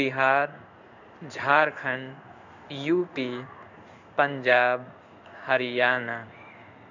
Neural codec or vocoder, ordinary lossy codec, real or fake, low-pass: codec, 16 kHz in and 24 kHz out, 1 kbps, XY-Tokenizer; none; fake; 7.2 kHz